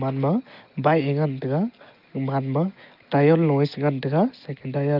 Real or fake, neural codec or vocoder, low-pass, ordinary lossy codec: real; none; 5.4 kHz; Opus, 24 kbps